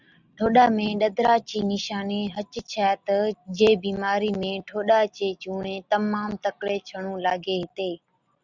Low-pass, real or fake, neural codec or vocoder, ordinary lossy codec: 7.2 kHz; real; none; Opus, 64 kbps